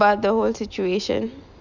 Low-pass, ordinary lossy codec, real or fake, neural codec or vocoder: 7.2 kHz; Opus, 64 kbps; real; none